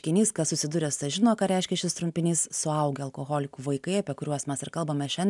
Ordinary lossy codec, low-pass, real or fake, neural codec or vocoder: MP3, 96 kbps; 10.8 kHz; real; none